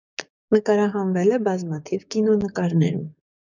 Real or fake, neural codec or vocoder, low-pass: fake; codec, 16 kHz, 6 kbps, DAC; 7.2 kHz